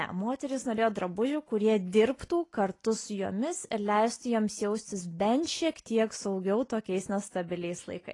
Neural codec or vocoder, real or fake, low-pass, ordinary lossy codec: none; real; 10.8 kHz; AAC, 32 kbps